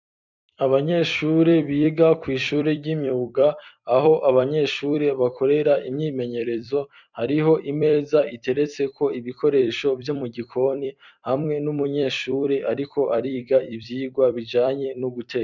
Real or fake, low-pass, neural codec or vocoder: fake; 7.2 kHz; vocoder, 44.1 kHz, 128 mel bands every 256 samples, BigVGAN v2